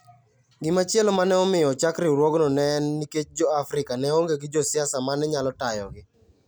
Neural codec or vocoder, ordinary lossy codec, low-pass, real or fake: none; none; none; real